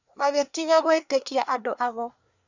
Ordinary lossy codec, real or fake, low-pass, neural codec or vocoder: none; fake; 7.2 kHz; codec, 24 kHz, 1 kbps, SNAC